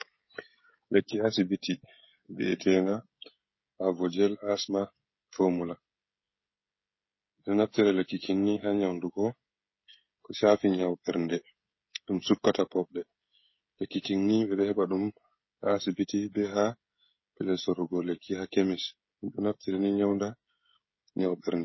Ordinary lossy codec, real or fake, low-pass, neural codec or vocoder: MP3, 24 kbps; fake; 7.2 kHz; codec, 16 kHz, 16 kbps, FreqCodec, smaller model